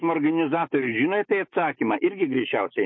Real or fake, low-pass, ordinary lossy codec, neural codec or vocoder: fake; 7.2 kHz; MP3, 32 kbps; autoencoder, 48 kHz, 128 numbers a frame, DAC-VAE, trained on Japanese speech